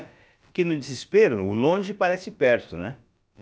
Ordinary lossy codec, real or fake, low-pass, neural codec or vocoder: none; fake; none; codec, 16 kHz, about 1 kbps, DyCAST, with the encoder's durations